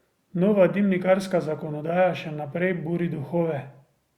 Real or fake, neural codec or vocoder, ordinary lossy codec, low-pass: real; none; Opus, 64 kbps; 19.8 kHz